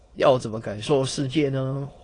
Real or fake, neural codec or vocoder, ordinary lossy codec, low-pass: fake; autoencoder, 22.05 kHz, a latent of 192 numbers a frame, VITS, trained on many speakers; AAC, 32 kbps; 9.9 kHz